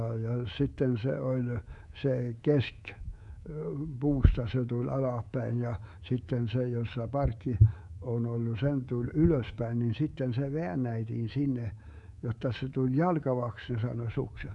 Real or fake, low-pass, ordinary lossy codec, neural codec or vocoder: fake; 10.8 kHz; none; codec, 24 kHz, 3.1 kbps, DualCodec